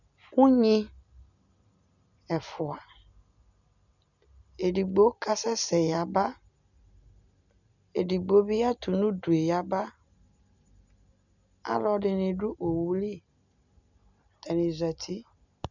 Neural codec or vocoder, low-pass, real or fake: none; 7.2 kHz; real